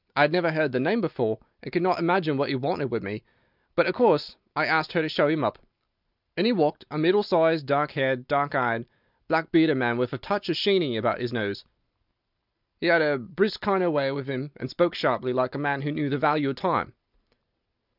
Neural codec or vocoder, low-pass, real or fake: none; 5.4 kHz; real